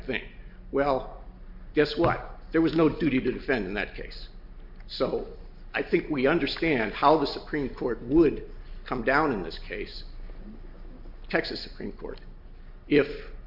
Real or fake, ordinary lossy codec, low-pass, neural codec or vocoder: real; MP3, 48 kbps; 5.4 kHz; none